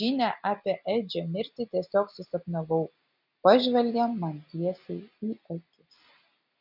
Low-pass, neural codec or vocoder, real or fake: 5.4 kHz; none; real